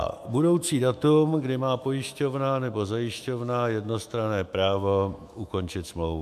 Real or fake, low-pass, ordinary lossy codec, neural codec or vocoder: fake; 14.4 kHz; MP3, 96 kbps; autoencoder, 48 kHz, 128 numbers a frame, DAC-VAE, trained on Japanese speech